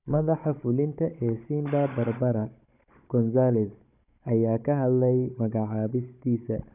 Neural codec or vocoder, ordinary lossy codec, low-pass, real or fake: codec, 16 kHz, 16 kbps, FunCodec, trained on Chinese and English, 50 frames a second; none; 3.6 kHz; fake